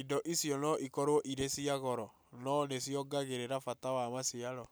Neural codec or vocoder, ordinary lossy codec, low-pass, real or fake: none; none; none; real